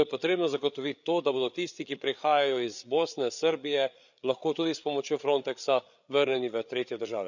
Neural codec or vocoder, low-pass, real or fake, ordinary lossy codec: codec, 16 kHz, 8 kbps, FreqCodec, larger model; 7.2 kHz; fake; none